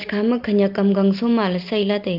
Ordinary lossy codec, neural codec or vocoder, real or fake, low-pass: Opus, 32 kbps; none; real; 5.4 kHz